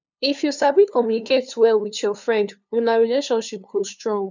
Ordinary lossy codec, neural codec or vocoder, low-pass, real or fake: none; codec, 16 kHz, 2 kbps, FunCodec, trained on LibriTTS, 25 frames a second; 7.2 kHz; fake